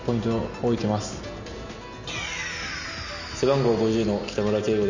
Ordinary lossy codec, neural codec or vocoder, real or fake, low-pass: Opus, 64 kbps; none; real; 7.2 kHz